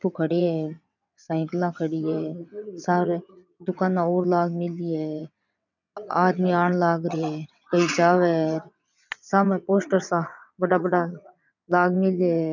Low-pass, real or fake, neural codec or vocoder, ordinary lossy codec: 7.2 kHz; fake; vocoder, 22.05 kHz, 80 mel bands, WaveNeXt; none